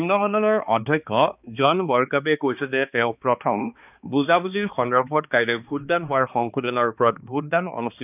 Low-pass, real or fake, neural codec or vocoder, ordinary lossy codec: 3.6 kHz; fake; codec, 16 kHz, 2 kbps, X-Codec, HuBERT features, trained on balanced general audio; none